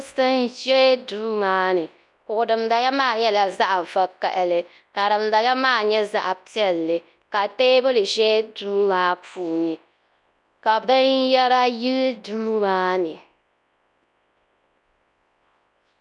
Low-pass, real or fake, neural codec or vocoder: 10.8 kHz; fake; codec, 24 kHz, 0.9 kbps, WavTokenizer, large speech release